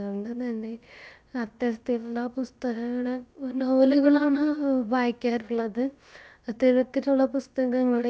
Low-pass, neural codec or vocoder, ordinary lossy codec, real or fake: none; codec, 16 kHz, about 1 kbps, DyCAST, with the encoder's durations; none; fake